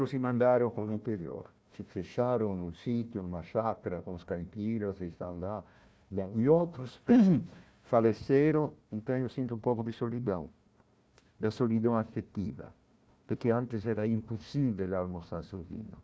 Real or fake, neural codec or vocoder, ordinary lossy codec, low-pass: fake; codec, 16 kHz, 1 kbps, FunCodec, trained on Chinese and English, 50 frames a second; none; none